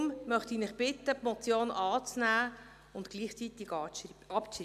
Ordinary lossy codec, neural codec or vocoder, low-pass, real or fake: none; none; 14.4 kHz; real